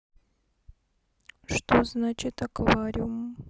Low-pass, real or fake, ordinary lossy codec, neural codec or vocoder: none; real; none; none